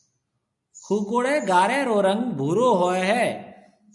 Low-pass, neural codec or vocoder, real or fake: 10.8 kHz; none; real